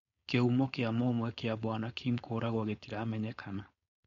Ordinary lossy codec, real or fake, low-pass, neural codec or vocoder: MP3, 48 kbps; fake; 7.2 kHz; codec, 16 kHz, 4.8 kbps, FACodec